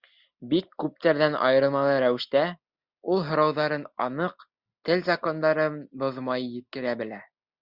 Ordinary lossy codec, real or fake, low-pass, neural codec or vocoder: Opus, 64 kbps; real; 5.4 kHz; none